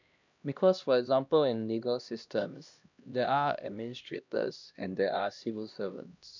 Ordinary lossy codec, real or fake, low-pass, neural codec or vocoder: none; fake; 7.2 kHz; codec, 16 kHz, 1 kbps, X-Codec, HuBERT features, trained on LibriSpeech